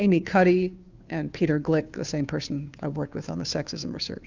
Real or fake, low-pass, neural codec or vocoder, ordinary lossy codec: fake; 7.2 kHz; codec, 16 kHz, 2 kbps, FunCodec, trained on Chinese and English, 25 frames a second; Opus, 64 kbps